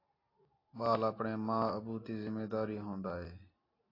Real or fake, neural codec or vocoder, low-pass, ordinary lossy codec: real; none; 5.4 kHz; AAC, 24 kbps